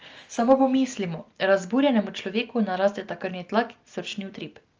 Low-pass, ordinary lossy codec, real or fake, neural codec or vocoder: 7.2 kHz; Opus, 24 kbps; real; none